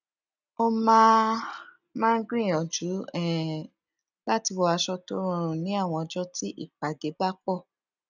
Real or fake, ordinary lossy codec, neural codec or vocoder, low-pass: real; none; none; 7.2 kHz